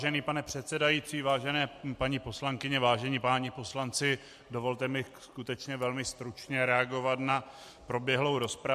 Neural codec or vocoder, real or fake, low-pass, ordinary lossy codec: none; real; 14.4 kHz; MP3, 64 kbps